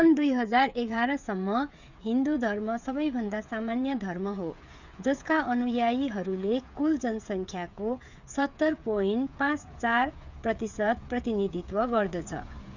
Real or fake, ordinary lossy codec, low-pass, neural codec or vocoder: fake; none; 7.2 kHz; codec, 16 kHz, 8 kbps, FreqCodec, smaller model